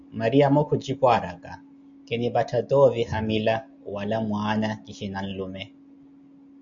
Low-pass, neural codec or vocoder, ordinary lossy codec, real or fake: 7.2 kHz; none; MP3, 96 kbps; real